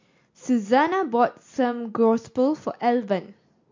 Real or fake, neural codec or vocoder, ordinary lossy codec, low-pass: real; none; MP3, 48 kbps; 7.2 kHz